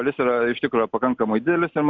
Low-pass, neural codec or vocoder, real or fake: 7.2 kHz; none; real